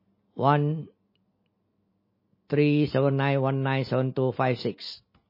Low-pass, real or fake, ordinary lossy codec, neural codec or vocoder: 5.4 kHz; real; MP3, 24 kbps; none